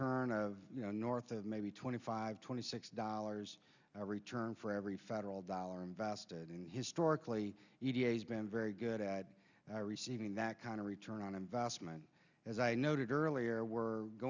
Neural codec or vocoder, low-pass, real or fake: none; 7.2 kHz; real